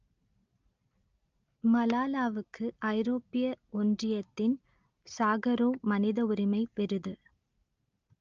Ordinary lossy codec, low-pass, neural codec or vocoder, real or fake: Opus, 24 kbps; 7.2 kHz; none; real